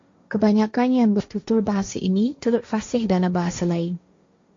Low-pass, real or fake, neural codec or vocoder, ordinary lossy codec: 7.2 kHz; fake; codec, 16 kHz, 1.1 kbps, Voila-Tokenizer; MP3, 48 kbps